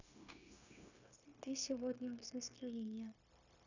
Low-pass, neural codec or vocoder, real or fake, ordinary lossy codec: 7.2 kHz; codec, 16 kHz, 0.9 kbps, LongCat-Audio-Codec; fake; none